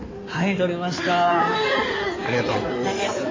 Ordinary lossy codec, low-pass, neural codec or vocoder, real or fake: MP3, 32 kbps; 7.2 kHz; codec, 16 kHz in and 24 kHz out, 2.2 kbps, FireRedTTS-2 codec; fake